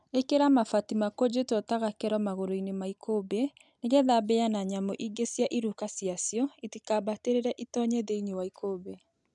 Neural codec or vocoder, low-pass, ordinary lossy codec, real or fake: none; 10.8 kHz; none; real